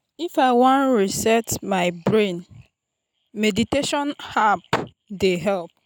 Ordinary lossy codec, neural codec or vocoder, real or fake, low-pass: none; none; real; 19.8 kHz